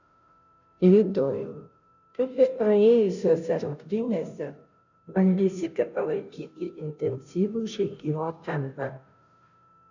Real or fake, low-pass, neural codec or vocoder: fake; 7.2 kHz; codec, 16 kHz, 0.5 kbps, FunCodec, trained on Chinese and English, 25 frames a second